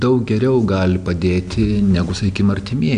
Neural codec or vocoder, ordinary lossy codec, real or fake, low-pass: none; AAC, 96 kbps; real; 9.9 kHz